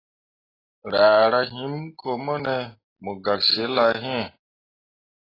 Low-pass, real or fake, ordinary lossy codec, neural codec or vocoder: 5.4 kHz; real; AAC, 24 kbps; none